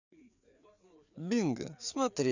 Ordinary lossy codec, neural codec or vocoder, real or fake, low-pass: none; codec, 16 kHz, 4 kbps, FreqCodec, larger model; fake; 7.2 kHz